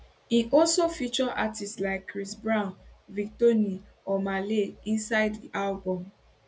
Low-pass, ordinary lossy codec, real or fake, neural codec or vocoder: none; none; real; none